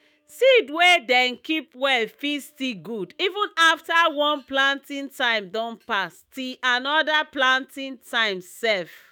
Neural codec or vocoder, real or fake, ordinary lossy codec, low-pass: autoencoder, 48 kHz, 128 numbers a frame, DAC-VAE, trained on Japanese speech; fake; none; 19.8 kHz